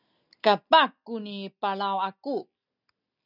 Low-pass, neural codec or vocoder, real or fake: 5.4 kHz; none; real